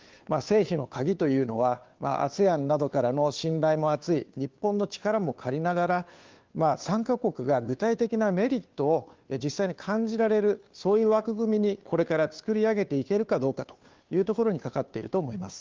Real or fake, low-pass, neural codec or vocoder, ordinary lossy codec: fake; 7.2 kHz; codec, 16 kHz, 2 kbps, FunCodec, trained on Chinese and English, 25 frames a second; Opus, 32 kbps